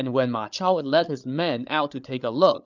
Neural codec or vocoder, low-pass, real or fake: codec, 16 kHz, 8 kbps, FreqCodec, larger model; 7.2 kHz; fake